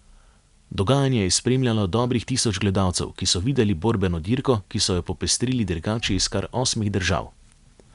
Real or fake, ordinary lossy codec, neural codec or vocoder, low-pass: real; none; none; 10.8 kHz